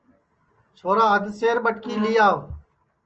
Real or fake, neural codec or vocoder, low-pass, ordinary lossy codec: real; none; 7.2 kHz; Opus, 24 kbps